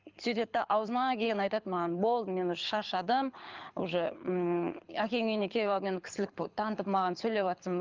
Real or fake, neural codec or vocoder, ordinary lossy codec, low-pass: fake; codec, 24 kHz, 6 kbps, HILCodec; Opus, 24 kbps; 7.2 kHz